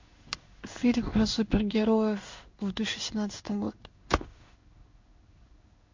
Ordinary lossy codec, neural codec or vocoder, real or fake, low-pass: MP3, 64 kbps; codec, 16 kHz in and 24 kHz out, 1 kbps, XY-Tokenizer; fake; 7.2 kHz